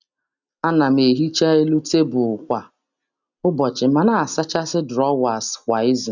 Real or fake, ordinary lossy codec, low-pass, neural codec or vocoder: real; none; 7.2 kHz; none